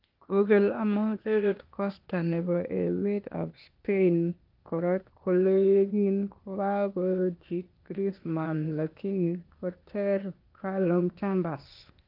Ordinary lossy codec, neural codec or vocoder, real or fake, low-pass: Opus, 32 kbps; codec, 16 kHz, 0.8 kbps, ZipCodec; fake; 5.4 kHz